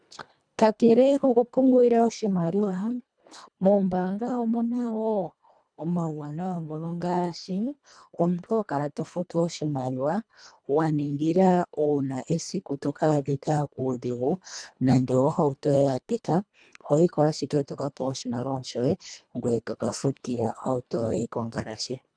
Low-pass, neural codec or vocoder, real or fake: 9.9 kHz; codec, 24 kHz, 1.5 kbps, HILCodec; fake